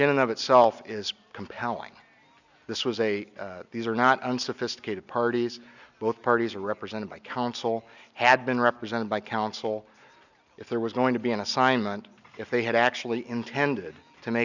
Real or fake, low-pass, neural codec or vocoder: real; 7.2 kHz; none